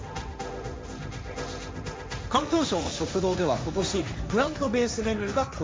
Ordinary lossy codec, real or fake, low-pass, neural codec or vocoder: none; fake; none; codec, 16 kHz, 1.1 kbps, Voila-Tokenizer